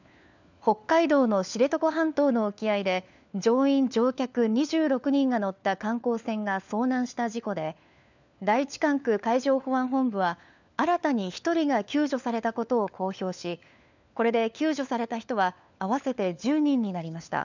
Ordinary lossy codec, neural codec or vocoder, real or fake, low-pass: none; codec, 16 kHz, 4 kbps, FunCodec, trained on LibriTTS, 50 frames a second; fake; 7.2 kHz